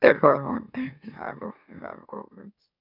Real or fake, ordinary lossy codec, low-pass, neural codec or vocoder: fake; none; 5.4 kHz; autoencoder, 44.1 kHz, a latent of 192 numbers a frame, MeloTTS